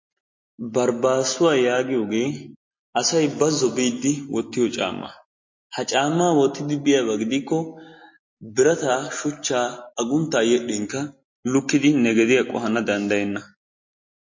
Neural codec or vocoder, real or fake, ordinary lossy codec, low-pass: none; real; MP3, 32 kbps; 7.2 kHz